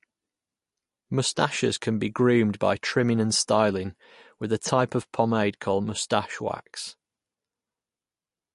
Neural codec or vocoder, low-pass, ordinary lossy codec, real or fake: none; 14.4 kHz; MP3, 48 kbps; real